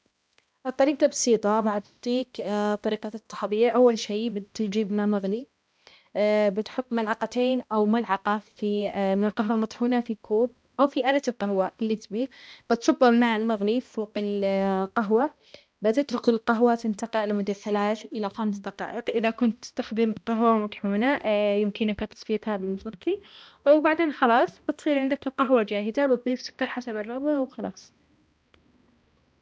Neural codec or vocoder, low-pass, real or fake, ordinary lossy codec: codec, 16 kHz, 1 kbps, X-Codec, HuBERT features, trained on balanced general audio; none; fake; none